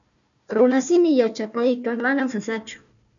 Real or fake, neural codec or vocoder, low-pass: fake; codec, 16 kHz, 1 kbps, FunCodec, trained on Chinese and English, 50 frames a second; 7.2 kHz